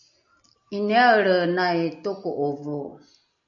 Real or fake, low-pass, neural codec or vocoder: real; 7.2 kHz; none